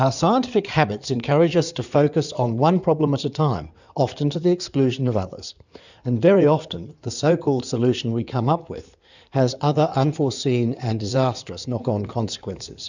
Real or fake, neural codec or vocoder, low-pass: fake; codec, 16 kHz in and 24 kHz out, 2.2 kbps, FireRedTTS-2 codec; 7.2 kHz